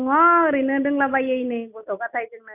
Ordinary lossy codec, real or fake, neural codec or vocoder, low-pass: none; real; none; 3.6 kHz